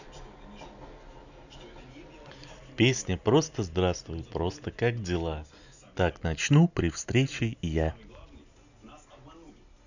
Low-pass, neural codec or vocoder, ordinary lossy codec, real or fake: 7.2 kHz; none; none; real